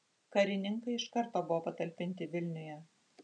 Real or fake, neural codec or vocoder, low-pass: real; none; 9.9 kHz